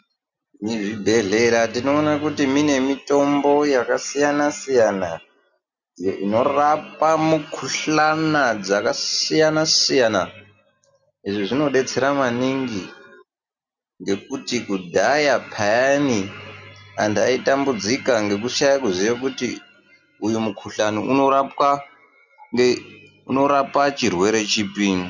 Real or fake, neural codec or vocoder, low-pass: real; none; 7.2 kHz